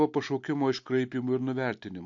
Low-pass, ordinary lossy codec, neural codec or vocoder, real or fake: 7.2 kHz; AAC, 64 kbps; none; real